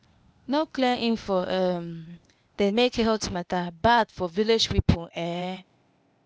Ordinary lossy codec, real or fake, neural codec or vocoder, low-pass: none; fake; codec, 16 kHz, 0.8 kbps, ZipCodec; none